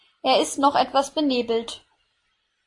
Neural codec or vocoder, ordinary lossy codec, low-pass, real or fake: none; AAC, 48 kbps; 10.8 kHz; real